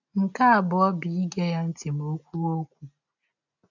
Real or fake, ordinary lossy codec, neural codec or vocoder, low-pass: real; none; none; 7.2 kHz